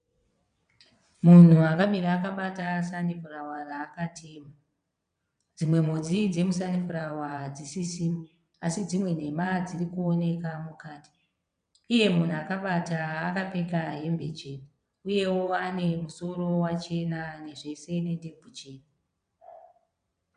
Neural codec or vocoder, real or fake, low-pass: vocoder, 22.05 kHz, 80 mel bands, WaveNeXt; fake; 9.9 kHz